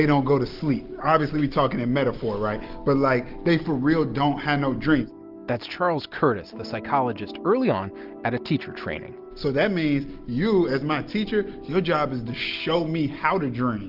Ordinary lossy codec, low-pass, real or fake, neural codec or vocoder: Opus, 32 kbps; 5.4 kHz; real; none